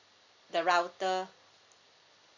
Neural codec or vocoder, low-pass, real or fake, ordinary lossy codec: none; 7.2 kHz; real; none